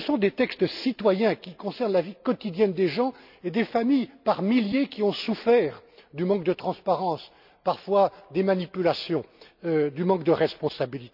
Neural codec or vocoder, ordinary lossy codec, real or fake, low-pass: none; none; real; 5.4 kHz